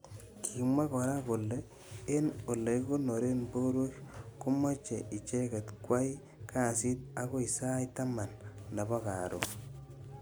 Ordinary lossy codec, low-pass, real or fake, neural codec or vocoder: none; none; real; none